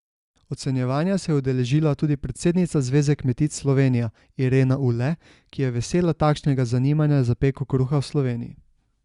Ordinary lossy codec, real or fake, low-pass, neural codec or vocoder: Opus, 64 kbps; real; 10.8 kHz; none